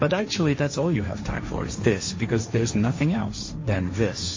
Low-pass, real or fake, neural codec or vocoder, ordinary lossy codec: 7.2 kHz; fake; codec, 16 kHz, 1.1 kbps, Voila-Tokenizer; MP3, 32 kbps